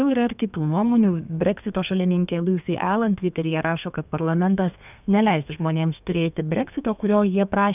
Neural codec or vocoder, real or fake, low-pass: codec, 32 kHz, 1.9 kbps, SNAC; fake; 3.6 kHz